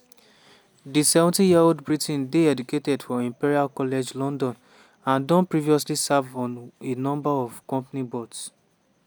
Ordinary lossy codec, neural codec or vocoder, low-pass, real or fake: none; none; none; real